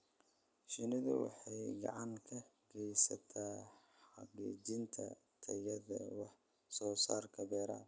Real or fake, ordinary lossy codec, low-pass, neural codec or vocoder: real; none; none; none